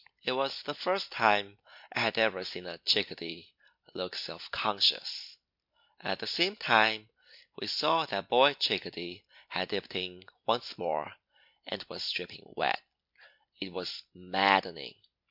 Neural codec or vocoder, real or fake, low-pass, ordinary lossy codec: none; real; 5.4 kHz; MP3, 48 kbps